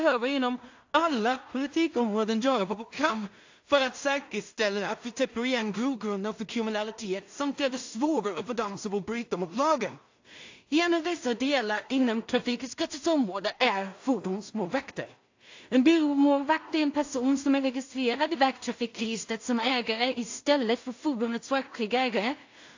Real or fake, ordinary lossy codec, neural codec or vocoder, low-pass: fake; AAC, 48 kbps; codec, 16 kHz in and 24 kHz out, 0.4 kbps, LongCat-Audio-Codec, two codebook decoder; 7.2 kHz